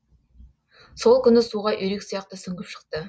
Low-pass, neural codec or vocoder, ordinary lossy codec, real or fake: none; none; none; real